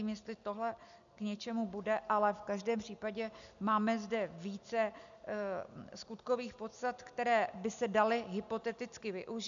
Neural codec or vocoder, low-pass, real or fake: none; 7.2 kHz; real